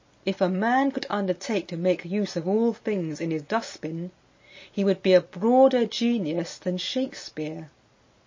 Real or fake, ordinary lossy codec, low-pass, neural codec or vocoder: real; MP3, 32 kbps; 7.2 kHz; none